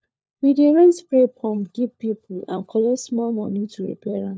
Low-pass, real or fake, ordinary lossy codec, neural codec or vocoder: none; fake; none; codec, 16 kHz, 4 kbps, FunCodec, trained on LibriTTS, 50 frames a second